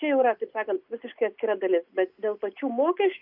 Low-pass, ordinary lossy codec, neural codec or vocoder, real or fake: 5.4 kHz; Opus, 64 kbps; none; real